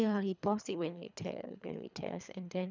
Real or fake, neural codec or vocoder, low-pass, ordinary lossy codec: fake; codec, 24 kHz, 3 kbps, HILCodec; 7.2 kHz; none